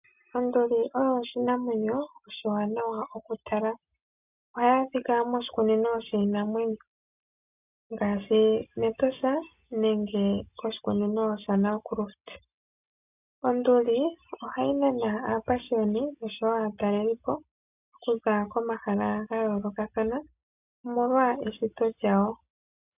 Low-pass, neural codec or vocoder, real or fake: 3.6 kHz; none; real